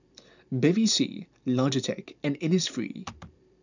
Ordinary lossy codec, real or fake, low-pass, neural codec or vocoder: none; fake; 7.2 kHz; vocoder, 44.1 kHz, 128 mel bands every 512 samples, BigVGAN v2